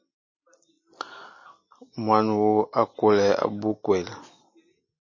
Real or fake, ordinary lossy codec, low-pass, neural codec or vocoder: real; MP3, 32 kbps; 7.2 kHz; none